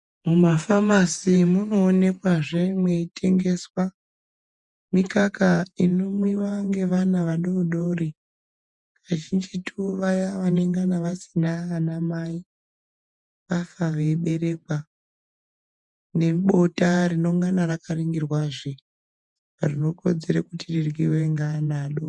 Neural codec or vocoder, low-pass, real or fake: vocoder, 48 kHz, 128 mel bands, Vocos; 10.8 kHz; fake